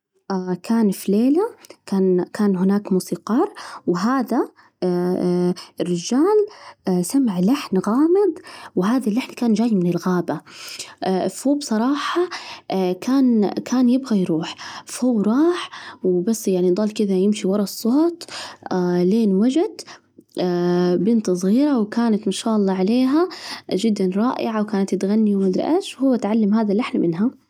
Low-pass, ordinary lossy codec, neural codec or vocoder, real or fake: 19.8 kHz; none; none; real